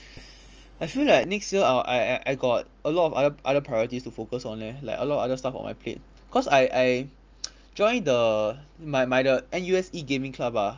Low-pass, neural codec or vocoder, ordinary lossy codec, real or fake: 7.2 kHz; none; Opus, 24 kbps; real